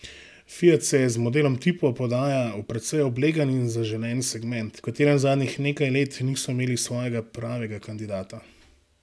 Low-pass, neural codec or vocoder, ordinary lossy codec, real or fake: none; none; none; real